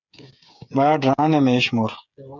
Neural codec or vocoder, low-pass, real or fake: codec, 16 kHz, 8 kbps, FreqCodec, smaller model; 7.2 kHz; fake